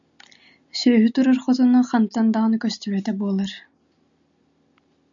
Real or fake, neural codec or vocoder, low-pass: real; none; 7.2 kHz